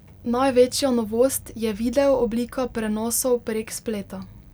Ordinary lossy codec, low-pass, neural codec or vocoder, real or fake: none; none; none; real